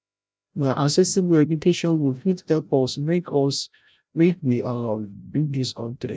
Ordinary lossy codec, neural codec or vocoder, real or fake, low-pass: none; codec, 16 kHz, 0.5 kbps, FreqCodec, larger model; fake; none